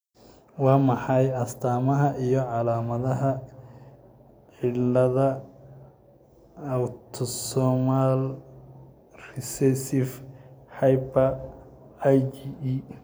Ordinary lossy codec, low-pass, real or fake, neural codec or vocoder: none; none; real; none